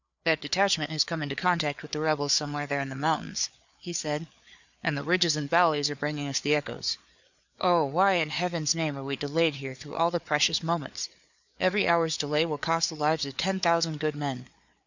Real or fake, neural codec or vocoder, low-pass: fake; codec, 16 kHz, 4 kbps, FreqCodec, larger model; 7.2 kHz